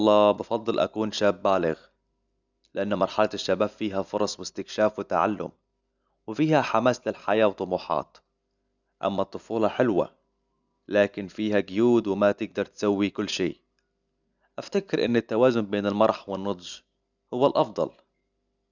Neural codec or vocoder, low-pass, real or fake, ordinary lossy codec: none; 7.2 kHz; real; none